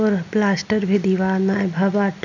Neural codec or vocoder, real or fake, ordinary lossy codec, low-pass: none; real; none; 7.2 kHz